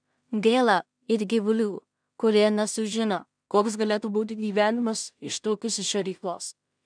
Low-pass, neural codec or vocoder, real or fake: 9.9 kHz; codec, 16 kHz in and 24 kHz out, 0.4 kbps, LongCat-Audio-Codec, two codebook decoder; fake